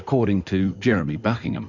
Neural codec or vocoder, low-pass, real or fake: codec, 16 kHz in and 24 kHz out, 2.2 kbps, FireRedTTS-2 codec; 7.2 kHz; fake